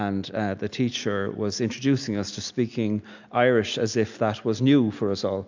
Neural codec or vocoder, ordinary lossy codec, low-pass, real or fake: none; MP3, 64 kbps; 7.2 kHz; real